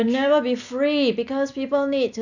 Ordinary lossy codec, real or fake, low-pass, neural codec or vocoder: none; real; 7.2 kHz; none